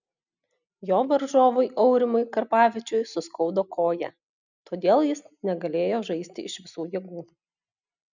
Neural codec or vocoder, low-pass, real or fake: none; 7.2 kHz; real